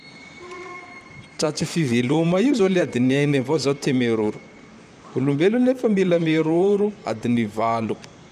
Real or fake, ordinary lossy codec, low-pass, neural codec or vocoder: fake; none; 14.4 kHz; vocoder, 44.1 kHz, 128 mel bands, Pupu-Vocoder